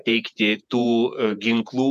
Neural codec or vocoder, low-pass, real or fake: vocoder, 48 kHz, 128 mel bands, Vocos; 14.4 kHz; fake